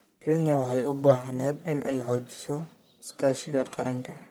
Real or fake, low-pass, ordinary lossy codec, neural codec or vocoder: fake; none; none; codec, 44.1 kHz, 1.7 kbps, Pupu-Codec